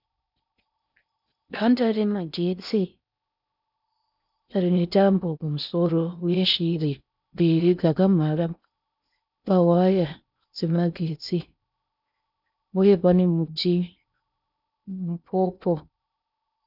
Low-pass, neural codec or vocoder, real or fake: 5.4 kHz; codec, 16 kHz in and 24 kHz out, 0.6 kbps, FocalCodec, streaming, 4096 codes; fake